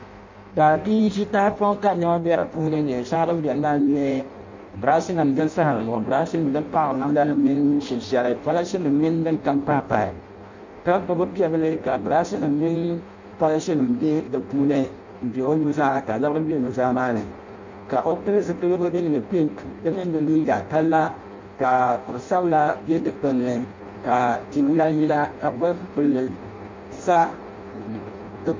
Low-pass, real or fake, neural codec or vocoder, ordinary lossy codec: 7.2 kHz; fake; codec, 16 kHz in and 24 kHz out, 0.6 kbps, FireRedTTS-2 codec; MP3, 64 kbps